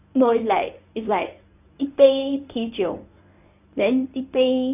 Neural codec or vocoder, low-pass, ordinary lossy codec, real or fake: codec, 24 kHz, 0.9 kbps, WavTokenizer, medium speech release version 1; 3.6 kHz; none; fake